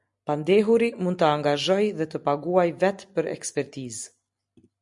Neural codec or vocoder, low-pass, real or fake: none; 10.8 kHz; real